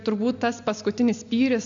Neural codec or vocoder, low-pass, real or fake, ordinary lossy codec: none; 7.2 kHz; real; AAC, 64 kbps